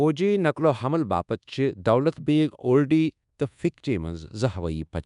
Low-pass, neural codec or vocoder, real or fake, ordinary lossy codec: 10.8 kHz; codec, 24 kHz, 1.2 kbps, DualCodec; fake; MP3, 96 kbps